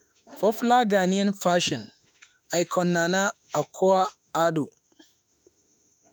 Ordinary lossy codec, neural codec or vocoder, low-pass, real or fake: none; autoencoder, 48 kHz, 32 numbers a frame, DAC-VAE, trained on Japanese speech; none; fake